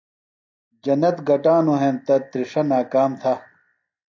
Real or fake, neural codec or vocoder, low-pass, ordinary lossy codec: real; none; 7.2 kHz; AAC, 48 kbps